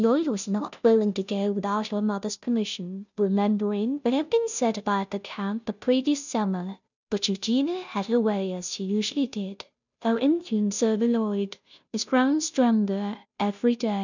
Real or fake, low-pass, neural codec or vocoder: fake; 7.2 kHz; codec, 16 kHz, 0.5 kbps, FunCodec, trained on Chinese and English, 25 frames a second